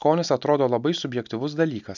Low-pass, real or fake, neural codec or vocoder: 7.2 kHz; real; none